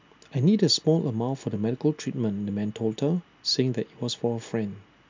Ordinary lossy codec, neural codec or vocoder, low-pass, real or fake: MP3, 64 kbps; none; 7.2 kHz; real